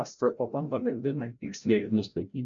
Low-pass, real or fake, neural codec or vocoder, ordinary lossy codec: 7.2 kHz; fake; codec, 16 kHz, 0.5 kbps, FreqCodec, larger model; MP3, 64 kbps